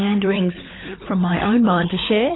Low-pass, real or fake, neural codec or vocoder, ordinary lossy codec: 7.2 kHz; fake; codec, 16 kHz, 16 kbps, FunCodec, trained on LibriTTS, 50 frames a second; AAC, 16 kbps